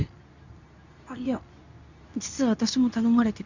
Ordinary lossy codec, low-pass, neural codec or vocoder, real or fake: none; 7.2 kHz; codec, 24 kHz, 0.9 kbps, WavTokenizer, medium speech release version 2; fake